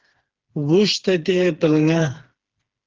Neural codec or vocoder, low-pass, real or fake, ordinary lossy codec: codec, 16 kHz, 4 kbps, FreqCodec, smaller model; 7.2 kHz; fake; Opus, 16 kbps